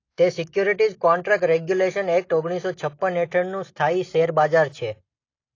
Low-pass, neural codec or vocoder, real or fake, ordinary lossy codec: 7.2 kHz; none; real; AAC, 32 kbps